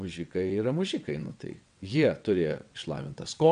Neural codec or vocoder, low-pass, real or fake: vocoder, 22.05 kHz, 80 mel bands, WaveNeXt; 9.9 kHz; fake